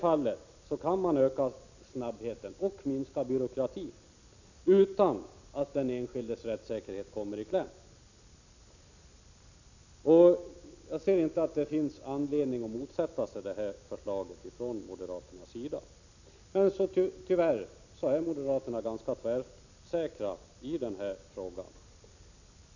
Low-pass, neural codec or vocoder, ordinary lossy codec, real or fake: 7.2 kHz; none; none; real